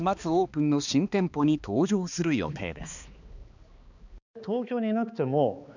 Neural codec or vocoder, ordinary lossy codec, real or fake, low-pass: codec, 16 kHz, 2 kbps, X-Codec, HuBERT features, trained on balanced general audio; none; fake; 7.2 kHz